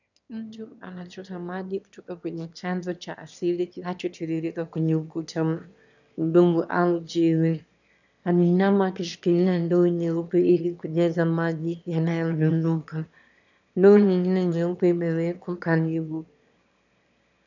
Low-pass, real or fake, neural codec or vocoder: 7.2 kHz; fake; autoencoder, 22.05 kHz, a latent of 192 numbers a frame, VITS, trained on one speaker